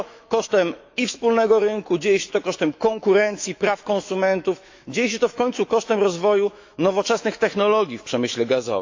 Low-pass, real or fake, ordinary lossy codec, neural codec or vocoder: 7.2 kHz; fake; AAC, 48 kbps; autoencoder, 48 kHz, 128 numbers a frame, DAC-VAE, trained on Japanese speech